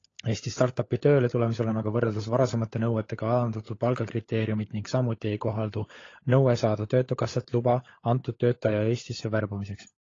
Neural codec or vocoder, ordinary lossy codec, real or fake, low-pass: codec, 16 kHz, 16 kbps, FunCodec, trained on LibriTTS, 50 frames a second; AAC, 32 kbps; fake; 7.2 kHz